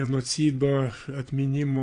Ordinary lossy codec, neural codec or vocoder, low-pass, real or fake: AAC, 48 kbps; vocoder, 22.05 kHz, 80 mel bands, Vocos; 9.9 kHz; fake